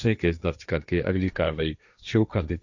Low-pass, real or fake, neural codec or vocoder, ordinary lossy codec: 7.2 kHz; fake; codec, 16 kHz, 1.1 kbps, Voila-Tokenizer; none